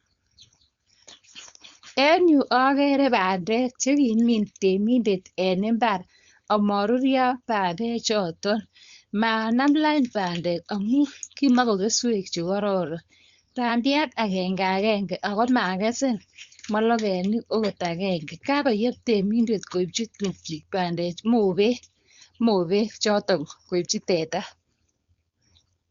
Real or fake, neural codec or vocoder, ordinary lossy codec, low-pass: fake; codec, 16 kHz, 4.8 kbps, FACodec; Opus, 64 kbps; 7.2 kHz